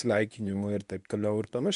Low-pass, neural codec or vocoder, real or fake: 10.8 kHz; codec, 24 kHz, 0.9 kbps, WavTokenizer, medium speech release version 1; fake